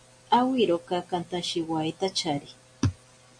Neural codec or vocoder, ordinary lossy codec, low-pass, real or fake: none; MP3, 64 kbps; 9.9 kHz; real